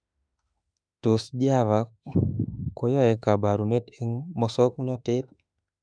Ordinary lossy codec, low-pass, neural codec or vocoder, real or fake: none; 9.9 kHz; autoencoder, 48 kHz, 32 numbers a frame, DAC-VAE, trained on Japanese speech; fake